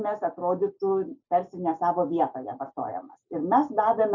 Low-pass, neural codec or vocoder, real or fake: 7.2 kHz; none; real